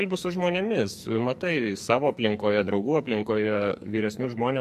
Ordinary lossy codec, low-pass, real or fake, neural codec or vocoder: MP3, 64 kbps; 14.4 kHz; fake; codec, 44.1 kHz, 2.6 kbps, SNAC